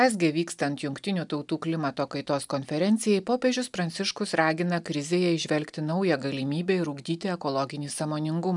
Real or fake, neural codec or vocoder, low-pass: real; none; 10.8 kHz